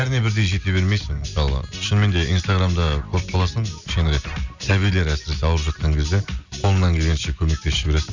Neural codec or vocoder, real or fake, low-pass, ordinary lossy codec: none; real; 7.2 kHz; Opus, 64 kbps